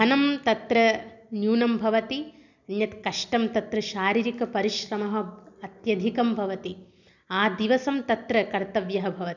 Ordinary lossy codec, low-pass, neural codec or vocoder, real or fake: none; 7.2 kHz; none; real